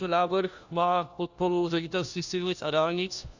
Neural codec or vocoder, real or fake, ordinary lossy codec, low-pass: codec, 16 kHz, 1 kbps, FunCodec, trained on LibriTTS, 50 frames a second; fake; Opus, 64 kbps; 7.2 kHz